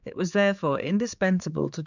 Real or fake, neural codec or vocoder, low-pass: fake; codec, 16 kHz, 4 kbps, X-Codec, HuBERT features, trained on general audio; 7.2 kHz